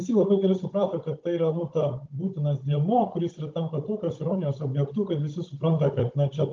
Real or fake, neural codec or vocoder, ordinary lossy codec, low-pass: fake; codec, 16 kHz, 16 kbps, FunCodec, trained on Chinese and English, 50 frames a second; Opus, 24 kbps; 7.2 kHz